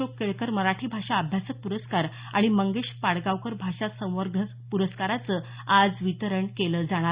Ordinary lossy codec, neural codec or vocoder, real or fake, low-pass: Opus, 64 kbps; none; real; 3.6 kHz